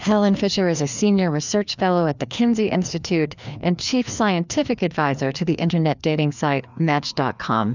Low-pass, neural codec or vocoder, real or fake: 7.2 kHz; codec, 16 kHz, 2 kbps, FreqCodec, larger model; fake